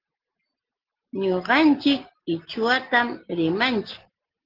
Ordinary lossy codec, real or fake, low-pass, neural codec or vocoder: Opus, 16 kbps; real; 5.4 kHz; none